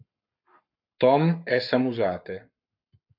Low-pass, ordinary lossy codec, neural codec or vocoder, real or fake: 5.4 kHz; MP3, 48 kbps; codec, 44.1 kHz, 7.8 kbps, Pupu-Codec; fake